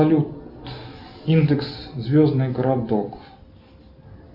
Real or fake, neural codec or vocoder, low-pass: real; none; 5.4 kHz